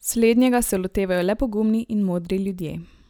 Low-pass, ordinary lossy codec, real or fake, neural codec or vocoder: none; none; real; none